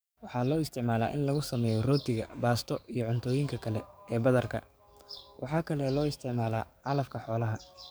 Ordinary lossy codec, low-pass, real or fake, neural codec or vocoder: none; none; fake; codec, 44.1 kHz, 7.8 kbps, DAC